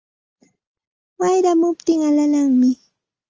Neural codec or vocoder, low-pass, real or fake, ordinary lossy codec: none; 7.2 kHz; real; Opus, 24 kbps